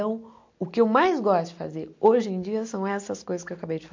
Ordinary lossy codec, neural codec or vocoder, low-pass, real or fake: MP3, 48 kbps; none; 7.2 kHz; real